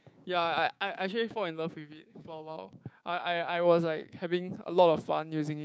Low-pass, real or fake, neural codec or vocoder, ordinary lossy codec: none; fake; codec, 16 kHz, 6 kbps, DAC; none